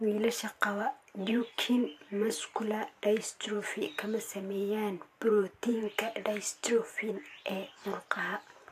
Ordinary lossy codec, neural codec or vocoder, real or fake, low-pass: AAC, 64 kbps; vocoder, 44.1 kHz, 128 mel bands every 512 samples, BigVGAN v2; fake; 14.4 kHz